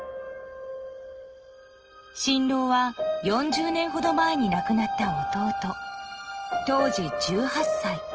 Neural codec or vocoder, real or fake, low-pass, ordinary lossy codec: none; real; 7.2 kHz; Opus, 16 kbps